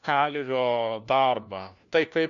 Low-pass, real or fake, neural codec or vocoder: 7.2 kHz; fake; codec, 16 kHz, 1 kbps, FunCodec, trained on LibriTTS, 50 frames a second